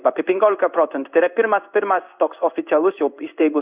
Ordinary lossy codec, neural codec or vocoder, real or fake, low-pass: Opus, 64 kbps; codec, 16 kHz in and 24 kHz out, 1 kbps, XY-Tokenizer; fake; 3.6 kHz